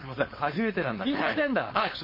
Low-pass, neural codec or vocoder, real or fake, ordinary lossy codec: 5.4 kHz; codec, 16 kHz, 4.8 kbps, FACodec; fake; MP3, 24 kbps